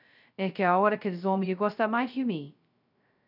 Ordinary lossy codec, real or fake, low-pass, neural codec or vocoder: none; fake; 5.4 kHz; codec, 16 kHz, 0.2 kbps, FocalCodec